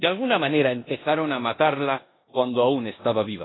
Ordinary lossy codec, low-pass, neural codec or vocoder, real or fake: AAC, 16 kbps; 7.2 kHz; codec, 16 kHz in and 24 kHz out, 0.9 kbps, LongCat-Audio-Codec, four codebook decoder; fake